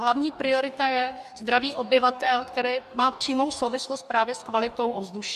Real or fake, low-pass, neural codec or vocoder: fake; 14.4 kHz; codec, 44.1 kHz, 2.6 kbps, DAC